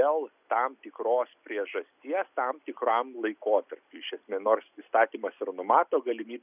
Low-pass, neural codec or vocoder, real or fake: 3.6 kHz; none; real